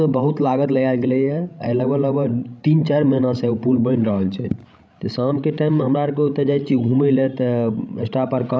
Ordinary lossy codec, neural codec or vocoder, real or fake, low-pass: none; codec, 16 kHz, 16 kbps, FreqCodec, larger model; fake; none